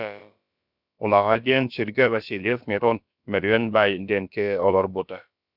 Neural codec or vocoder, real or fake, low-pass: codec, 16 kHz, about 1 kbps, DyCAST, with the encoder's durations; fake; 5.4 kHz